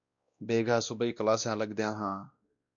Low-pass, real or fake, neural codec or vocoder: 7.2 kHz; fake; codec, 16 kHz, 1 kbps, X-Codec, WavLM features, trained on Multilingual LibriSpeech